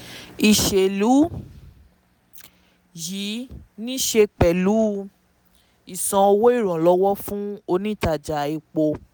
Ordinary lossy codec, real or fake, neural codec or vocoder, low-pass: none; real; none; none